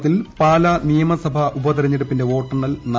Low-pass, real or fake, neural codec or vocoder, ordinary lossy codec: none; real; none; none